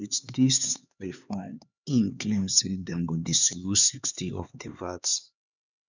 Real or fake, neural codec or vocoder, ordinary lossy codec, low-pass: fake; codec, 16 kHz, 4 kbps, X-Codec, HuBERT features, trained on LibriSpeech; none; 7.2 kHz